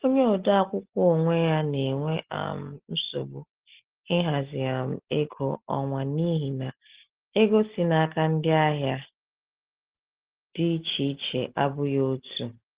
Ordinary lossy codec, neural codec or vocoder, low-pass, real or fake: Opus, 16 kbps; none; 3.6 kHz; real